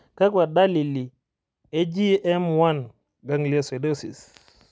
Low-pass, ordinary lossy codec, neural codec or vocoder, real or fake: none; none; none; real